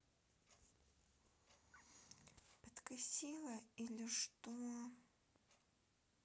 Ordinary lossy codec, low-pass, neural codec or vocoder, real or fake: none; none; none; real